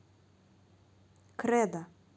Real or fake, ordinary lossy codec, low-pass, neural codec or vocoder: real; none; none; none